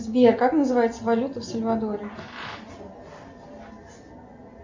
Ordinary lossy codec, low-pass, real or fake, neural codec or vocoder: MP3, 64 kbps; 7.2 kHz; real; none